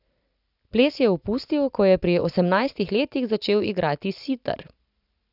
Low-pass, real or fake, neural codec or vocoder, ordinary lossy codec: 5.4 kHz; real; none; none